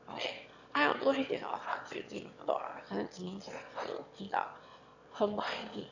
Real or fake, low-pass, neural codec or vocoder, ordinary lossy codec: fake; 7.2 kHz; autoencoder, 22.05 kHz, a latent of 192 numbers a frame, VITS, trained on one speaker; none